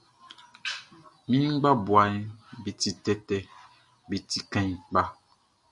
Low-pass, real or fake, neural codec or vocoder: 10.8 kHz; real; none